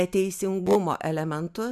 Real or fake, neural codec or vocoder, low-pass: real; none; 14.4 kHz